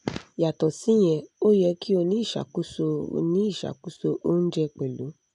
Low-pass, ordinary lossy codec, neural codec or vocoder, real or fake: 10.8 kHz; none; none; real